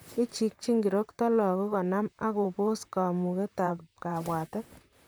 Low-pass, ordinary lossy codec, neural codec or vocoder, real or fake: none; none; vocoder, 44.1 kHz, 128 mel bands, Pupu-Vocoder; fake